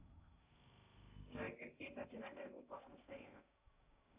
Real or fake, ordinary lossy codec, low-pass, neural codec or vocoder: fake; Opus, 64 kbps; 3.6 kHz; codec, 16 kHz in and 24 kHz out, 0.6 kbps, FocalCodec, streaming, 2048 codes